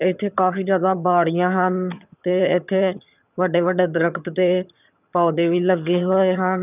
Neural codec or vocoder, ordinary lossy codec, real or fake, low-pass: vocoder, 22.05 kHz, 80 mel bands, HiFi-GAN; none; fake; 3.6 kHz